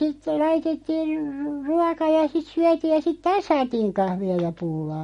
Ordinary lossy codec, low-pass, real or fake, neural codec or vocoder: MP3, 48 kbps; 19.8 kHz; real; none